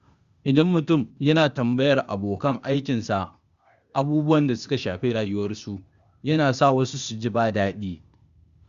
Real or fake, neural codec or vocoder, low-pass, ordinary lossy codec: fake; codec, 16 kHz, 0.8 kbps, ZipCodec; 7.2 kHz; Opus, 64 kbps